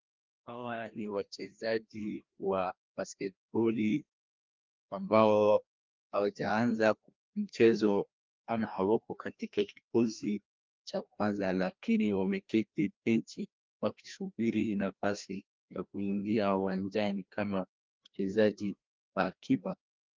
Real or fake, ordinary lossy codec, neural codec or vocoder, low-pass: fake; Opus, 32 kbps; codec, 16 kHz, 1 kbps, FreqCodec, larger model; 7.2 kHz